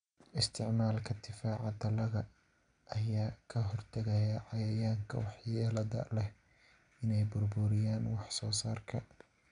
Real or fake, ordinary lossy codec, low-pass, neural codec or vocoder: real; none; 9.9 kHz; none